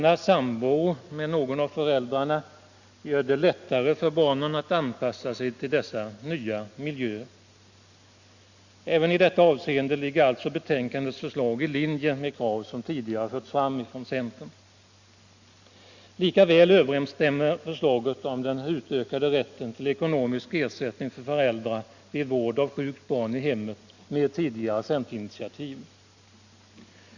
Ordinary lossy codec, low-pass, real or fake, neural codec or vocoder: Opus, 64 kbps; 7.2 kHz; real; none